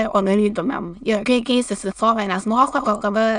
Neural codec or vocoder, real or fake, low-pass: autoencoder, 22.05 kHz, a latent of 192 numbers a frame, VITS, trained on many speakers; fake; 9.9 kHz